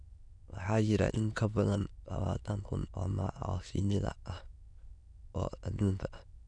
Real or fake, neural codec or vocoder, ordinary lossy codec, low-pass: fake; autoencoder, 22.05 kHz, a latent of 192 numbers a frame, VITS, trained on many speakers; none; 9.9 kHz